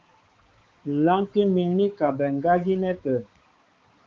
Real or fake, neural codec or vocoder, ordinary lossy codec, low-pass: fake; codec, 16 kHz, 4 kbps, X-Codec, HuBERT features, trained on balanced general audio; Opus, 24 kbps; 7.2 kHz